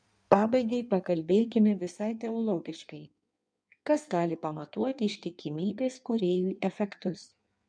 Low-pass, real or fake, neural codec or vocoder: 9.9 kHz; fake; codec, 16 kHz in and 24 kHz out, 1.1 kbps, FireRedTTS-2 codec